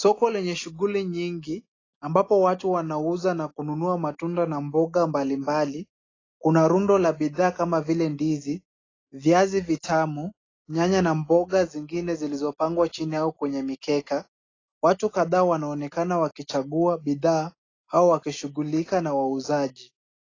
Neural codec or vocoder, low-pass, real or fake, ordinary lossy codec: none; 7.2 kHz; real; AAC, 32 kbps